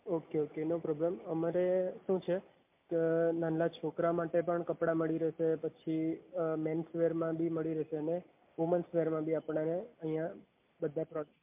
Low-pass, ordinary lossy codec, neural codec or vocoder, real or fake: 3.6 kHz; MP3, 32 kbps; none; real